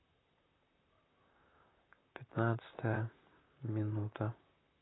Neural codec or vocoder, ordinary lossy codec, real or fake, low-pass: vocoder, 44.1 kHz, 128 mel bands, Pupu-Vocoder; AAC, 16 kbps; fake; 7.2 kHz